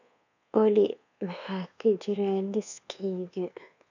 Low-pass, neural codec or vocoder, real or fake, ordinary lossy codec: 7.2 kHz; codec, 24 kHz, 1.2 kbps, DualCodec; fake; none